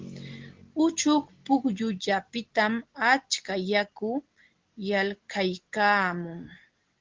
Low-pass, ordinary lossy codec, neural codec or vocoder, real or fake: 7.2 kHz; Opus, 16 kbps; none; real